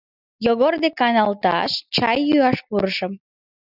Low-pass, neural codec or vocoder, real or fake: 5.4 kHz; none; real